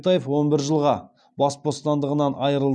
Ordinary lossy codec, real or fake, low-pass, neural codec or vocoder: none; real; 9.9 kHz; none